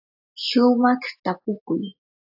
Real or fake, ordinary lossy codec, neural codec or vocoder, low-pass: real; AAC, 48 kbps; none; 5.4 kHz